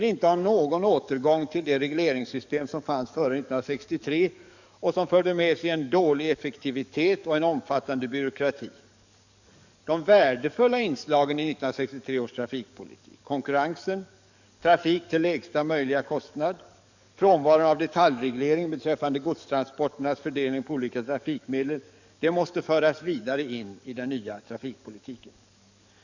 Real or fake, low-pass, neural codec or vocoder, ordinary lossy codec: fake; 7.2 kHz; codec, 44.1 kHz, 7.8 kbps, DAC; none